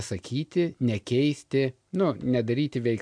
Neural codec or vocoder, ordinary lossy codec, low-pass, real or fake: none; MP3, 64 kbps; 9.9 kHz; real